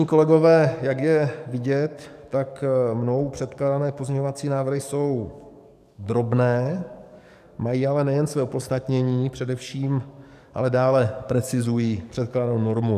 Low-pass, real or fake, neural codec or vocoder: 14.4 kHz; fake; codec, 44.1 kHz, 7.8 kbps, DAC